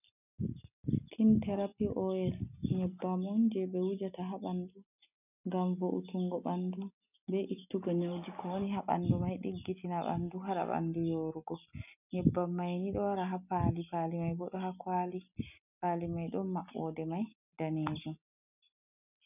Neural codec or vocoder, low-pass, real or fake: none; 3.6 kHz; real